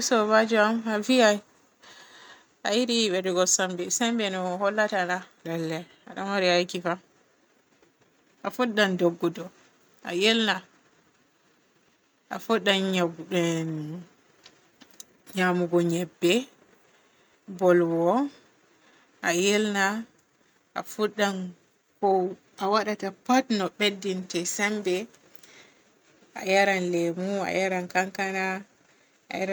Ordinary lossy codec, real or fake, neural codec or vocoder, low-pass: none; real; none; none